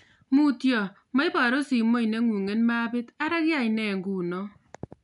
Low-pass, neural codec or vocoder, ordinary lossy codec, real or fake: 10.8 kHz; none; none; real